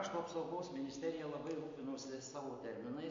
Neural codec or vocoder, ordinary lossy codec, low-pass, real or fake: none; MP3, 48 kbps; 7.2 kHz; real